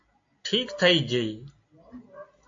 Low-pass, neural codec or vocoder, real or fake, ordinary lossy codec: 7.2 kHz; none; real; AAC, 48 kbps